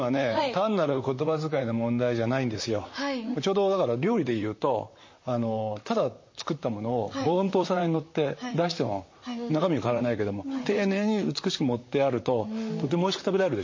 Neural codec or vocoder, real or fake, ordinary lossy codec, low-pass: vocoder, 44.1 kHz, 128 mel bands, Pupu-Vocoder; fake; MP3, 32 kbps; 7.2 kHz